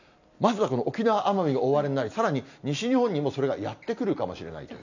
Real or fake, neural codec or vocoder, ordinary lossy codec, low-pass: real; none; none; 7.2 kHz